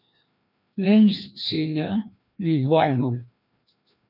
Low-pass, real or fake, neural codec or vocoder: 5.4 kHz; fake; codec, 16 kHz, 1 kbps, FreqCodec, larger model